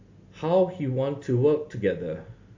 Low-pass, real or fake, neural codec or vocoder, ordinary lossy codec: 7.2 kHz; real; none; AAC, 48 kbps